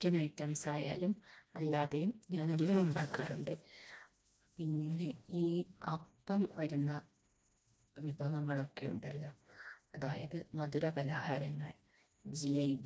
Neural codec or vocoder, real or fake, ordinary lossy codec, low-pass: codec, 16 kHz, 1 kbps, FreqCodec, smaller model; fake; none; none